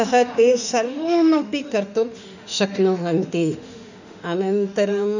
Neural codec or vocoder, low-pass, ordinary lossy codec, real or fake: autoencoder, 48 kHz, 32 numbers a frame, DAC-VAE, trained on Japanese speech; 7.2 kHz; none; fake